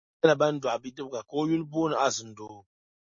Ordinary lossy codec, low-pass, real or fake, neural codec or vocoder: MP3, 32 kbps; 7.2 kHz; real; none